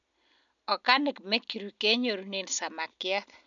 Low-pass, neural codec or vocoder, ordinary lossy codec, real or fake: 7.2 kHz; none; none; real